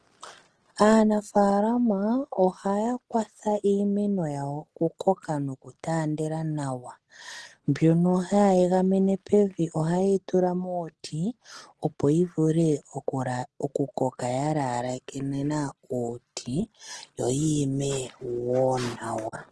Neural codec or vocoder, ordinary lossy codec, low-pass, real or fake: none; Opus, 16 kbps; 10.8 kHz; real